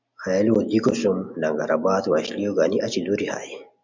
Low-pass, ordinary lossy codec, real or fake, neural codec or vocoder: 7.2 kHz; MP3, 64 kbps; real; none